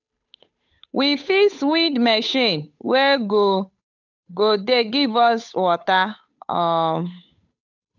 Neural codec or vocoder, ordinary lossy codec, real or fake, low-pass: codec, 16 kHz, 8 kbps, FunCodec, trained on Chinese and English, 25 frames a second; none; fake; 7.2 kHz